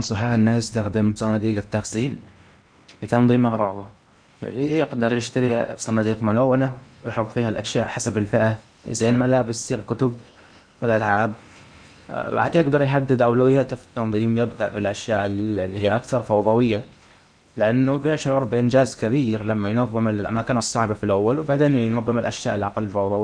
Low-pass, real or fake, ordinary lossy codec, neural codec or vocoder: 9.9 kHz; fake; none; codec, 16 kHz in and 24 kHz out, 0.8 kbps, FocalCodec, streaming, 65536 codes